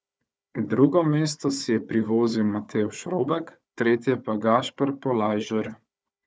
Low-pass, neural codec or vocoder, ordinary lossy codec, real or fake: none; codec, 16 kHz, 4 kbps, FunCodec, trained on Chinese and English, 50 frames a second; none; fake